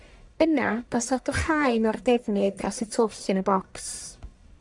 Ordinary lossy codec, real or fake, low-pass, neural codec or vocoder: MP3, 96 kbps; fake; 10.8 kHz; codec, 44.1 kHz, 1.7 kbps, Pupu-Codec